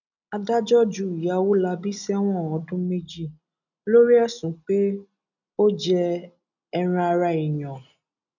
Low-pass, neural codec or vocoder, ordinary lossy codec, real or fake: 7.2 kHz; none; none; real